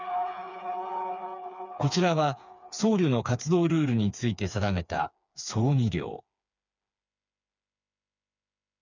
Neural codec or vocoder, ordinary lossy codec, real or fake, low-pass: codec, 16 kHz, 4 kbps, FreqCodec, smaller model; none; fake; 7.2 kHz